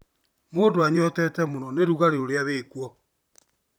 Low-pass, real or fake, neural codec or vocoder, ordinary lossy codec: none; fake; vocoder, 44.1 kHz, 128 mel bands, Pupu-Vocoder; none